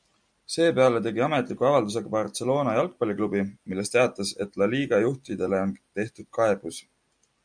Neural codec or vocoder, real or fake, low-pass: none; real; 9.9 kHz